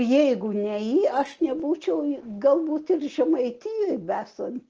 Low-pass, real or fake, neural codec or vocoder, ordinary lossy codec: 7.2 kHz; real; none; Opus, 16 kbps